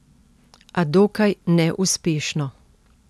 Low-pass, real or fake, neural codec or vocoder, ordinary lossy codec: none; real; none; none